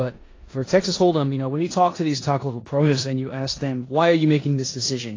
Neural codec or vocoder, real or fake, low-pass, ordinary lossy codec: codec, 16 kHz in and 24 kHz out, 0.9 kbps, LongCat-Audio-Codec, four codebook decoder; fake; 7.2 kHz; AAC, 32 kbps